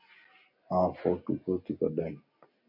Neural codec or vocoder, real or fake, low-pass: none; real; 5.4 kHz